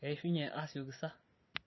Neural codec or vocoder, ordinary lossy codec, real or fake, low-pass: none; MP3, 24 kbps; real; 7.2 kHz